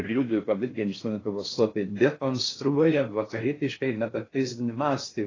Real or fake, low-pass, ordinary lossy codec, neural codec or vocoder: fake; 7.2 kHz; AAC, 32 kbps; codec, 16 kHz in and 24 kHz out, 0.6 kbps, FocalCodec, streaming, 4096 codes